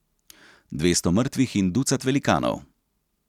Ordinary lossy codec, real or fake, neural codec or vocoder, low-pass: none; real; none; 19.8 kHz